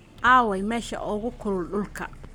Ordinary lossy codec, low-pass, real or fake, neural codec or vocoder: none; none; fake; codec, 44.1 kHz, 7.8 kbps, Pupu-Codec